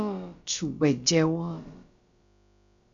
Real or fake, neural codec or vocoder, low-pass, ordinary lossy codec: fake; codec, 16 kHz, about 1 kbps, DyCAST, with the encoder's durations; 7.2 kHz; MP3, 96 kbps